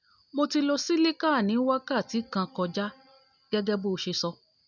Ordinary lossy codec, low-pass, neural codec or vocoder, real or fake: none; 7.2 kHz; none; real